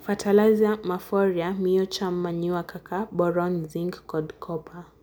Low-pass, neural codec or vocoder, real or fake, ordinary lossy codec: none; none; real; none